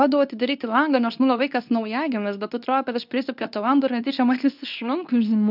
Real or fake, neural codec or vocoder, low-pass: fake; codec, 24 kHz, 0.9 kbps, WavTokenizer, medium speech release version 2; 5.4 kHz